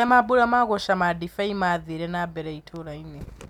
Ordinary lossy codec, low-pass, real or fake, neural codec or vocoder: none; 19.8 kHz; real; none